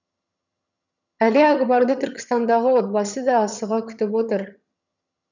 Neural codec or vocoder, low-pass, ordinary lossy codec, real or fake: vocoder, 22.05 kHz, 80 mel bands, HiFi-GAN; 7.2 kHz; none; fake